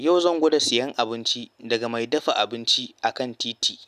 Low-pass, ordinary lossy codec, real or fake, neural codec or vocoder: 14.4 kHz; none; real; none